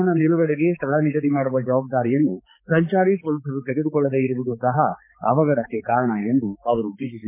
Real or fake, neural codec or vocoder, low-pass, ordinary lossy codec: fake; codec, 16 kHz, 4 kbps, X-Codec, HuBERT features, trained on balanced general audio; 3.6 kHz; MP3, 24 kbps